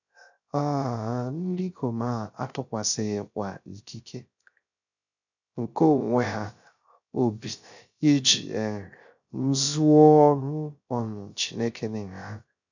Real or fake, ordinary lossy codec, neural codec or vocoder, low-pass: fake; none; codec, 16 kHz, 0.3 kbps, FocalCodec; 7.2 kHz